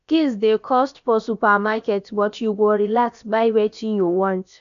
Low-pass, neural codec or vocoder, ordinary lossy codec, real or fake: 7.2 kHz; codec, 16 kHz, about 1 kbps, DyCAST, with the encoder's durations; none; fake